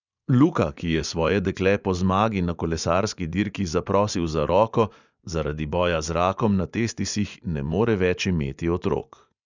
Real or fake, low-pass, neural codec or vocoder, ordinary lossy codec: fake; 7.2 kHz; vocoder, 24 kHz, 100 mel bands, Vocos; none